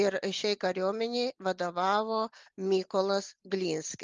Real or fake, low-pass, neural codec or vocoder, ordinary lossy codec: real; 7.2 kHz; none; Opus, 32 kbps